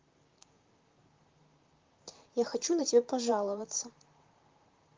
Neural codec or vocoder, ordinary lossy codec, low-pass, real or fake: vocoder, 44.1 kHz, 128 mel bands, Pupu-Vocoder; Opus, 32 kbps; 7.2 kHz; fake